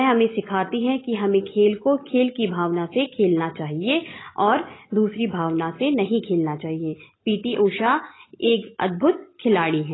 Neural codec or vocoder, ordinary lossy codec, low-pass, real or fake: none; AAC, 16 kbps; 7.2 kHz; real